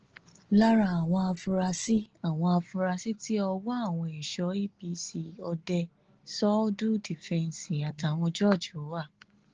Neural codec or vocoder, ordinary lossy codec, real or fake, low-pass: none; Opus, 16 kbps; real; 7.2 kHz